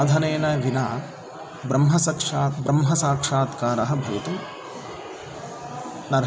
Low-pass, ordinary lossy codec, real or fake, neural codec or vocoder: none; none; real; none